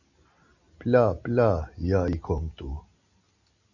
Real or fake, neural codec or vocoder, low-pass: real; none; 7.2 kHz